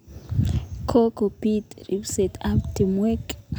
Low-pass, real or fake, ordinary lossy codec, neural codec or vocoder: none; real; none; none